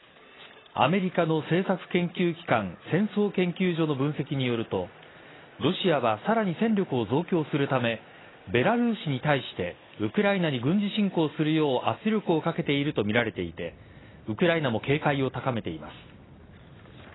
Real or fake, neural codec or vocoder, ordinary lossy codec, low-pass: real; none; AAC, 16 kbps; 7.2 kHz